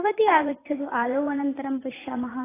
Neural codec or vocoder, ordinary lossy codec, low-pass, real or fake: vocoder, 44.1 kHz, 128 mel bands every 512 samples, BigVGAN v2; AAC, 16 kbps; 3.6 kHz; fake